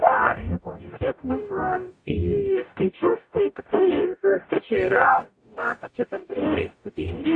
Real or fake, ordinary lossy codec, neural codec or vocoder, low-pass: fake; AAC, 48 kbps; codec, 44.1 kHz, 0.9 kbps, DAC; 9.9 kHz